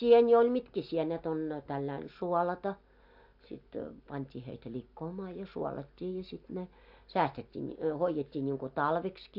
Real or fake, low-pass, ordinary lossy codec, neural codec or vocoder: real; 5.4 kHz; AAC, 48 kbps; none